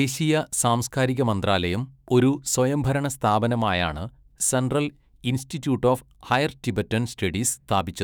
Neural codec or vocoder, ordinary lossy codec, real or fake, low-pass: autoencoder, 48 kHz, 128 numbers a frame, DAC-VAE, trained on Japanese speech; none; fake; none